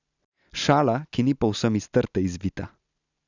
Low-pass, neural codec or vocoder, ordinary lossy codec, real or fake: 7.2 kHz; none; none; real